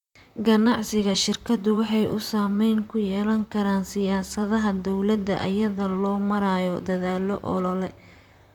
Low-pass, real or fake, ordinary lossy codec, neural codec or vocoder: 19.8 kHz; fake; none; vocoder, 44.1 kHz, 128 mel bands, Pupu-Vocoder